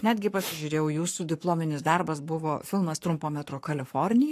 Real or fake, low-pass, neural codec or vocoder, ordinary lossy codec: fake; 14.4 kHz; codec, 44.1 kHz, 7.8 kbps, Pupu-Codec; AAC, 64 kbps